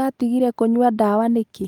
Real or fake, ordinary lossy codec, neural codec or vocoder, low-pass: real; Opus, 32 kbps; none; 19.8 kHz